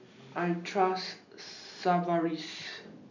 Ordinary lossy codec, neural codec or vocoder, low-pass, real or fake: none; none; 7.2 kHz; real